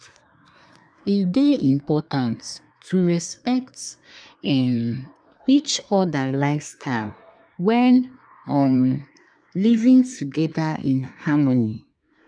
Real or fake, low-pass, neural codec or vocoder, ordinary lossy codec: fake; 9.9 kHz; codec, 24 kHz, 1 kbps, SNAC; none